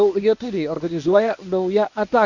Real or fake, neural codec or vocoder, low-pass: fake; codec, 24 kHz, 0.9 kbps, WavTokenizer, medium speech release version 1; 7.2 kHz